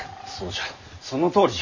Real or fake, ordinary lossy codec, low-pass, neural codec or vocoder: real; none; 7.2 kHz; none